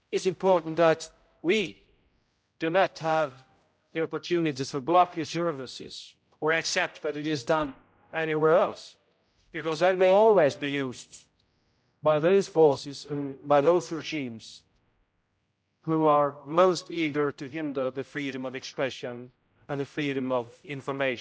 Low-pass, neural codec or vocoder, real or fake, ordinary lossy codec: none; codec, 16 kHz, 0.5 kbps, X-Codec, HuBERT features, trained on general audio; fake; none